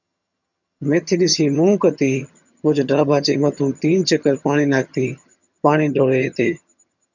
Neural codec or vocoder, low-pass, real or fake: vocoder, 22.05 kHz, 80 mel bands, HiFi-GAN; 7.2 kHz; fake